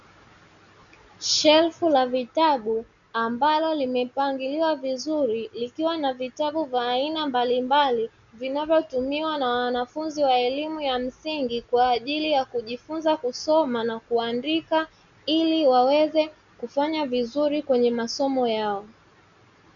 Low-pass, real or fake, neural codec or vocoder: 7.2 kHz; real; none